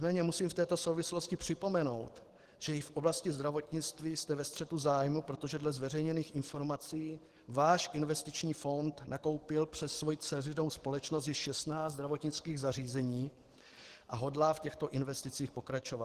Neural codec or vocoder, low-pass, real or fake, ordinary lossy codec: codec, 44.1 kHz, 7.8 kbps, DAC; 14.4 kHz; fake; Opus, 16 kbps